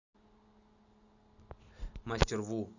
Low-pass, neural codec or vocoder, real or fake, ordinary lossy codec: 7.2 kHz; none; real; none